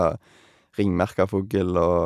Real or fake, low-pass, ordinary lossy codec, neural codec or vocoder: real; 14.4 kHz; none; none